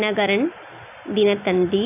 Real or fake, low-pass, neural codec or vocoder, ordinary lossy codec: real; 3.6 kHz; none; none